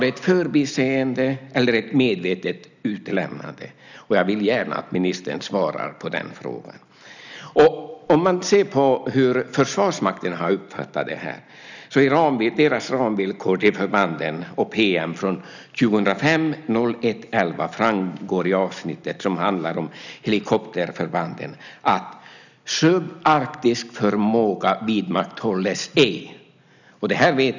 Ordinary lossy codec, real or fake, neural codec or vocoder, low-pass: none; real; none; 7.2 kHz